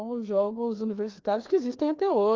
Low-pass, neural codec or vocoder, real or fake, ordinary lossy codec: 7.2 kHz; codec, 16 kHz, 2 kbps, FreqCodec, larger model; fake; Opus, 32 kbps